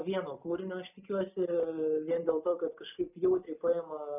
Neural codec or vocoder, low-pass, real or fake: none; 3.6 kHz; real